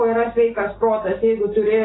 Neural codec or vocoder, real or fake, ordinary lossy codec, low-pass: none; real; AAC, 16 kbps; 7.2 kHz